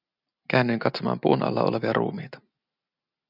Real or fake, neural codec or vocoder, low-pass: real; none; 5.4 kHz